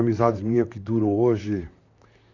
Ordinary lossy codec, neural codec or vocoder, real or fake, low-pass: none; vocoder, 44.1 kHz, 128 mel bands, Pupu-Vocoder; fake; 7.2 kHz